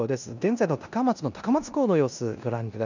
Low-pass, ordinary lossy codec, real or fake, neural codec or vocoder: 7.2 kHz; none; fake; codec, 16 kHz in and 24 kHz out, 0.9 kbps, LongCat-Audio-Codec, four codebook decoder